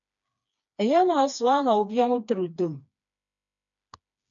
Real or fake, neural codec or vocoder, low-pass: fake; codec, 16 kHz, 2 kbps, FreqCodec, smaller model; 7.2 kHz